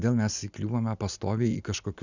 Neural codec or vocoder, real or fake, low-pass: none; real; 7.2 kHz